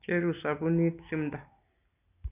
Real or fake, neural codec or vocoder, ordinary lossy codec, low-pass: real; none; none; 3.6 kHz